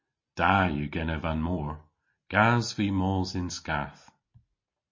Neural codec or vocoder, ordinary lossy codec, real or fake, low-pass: none; MP3, 32 kbps; real; 7.2 kHz